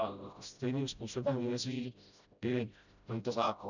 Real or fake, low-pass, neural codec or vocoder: fake; 7.2 kHz; codec, 16 kHz, 0.5 kbps, FreqCodec, smaller model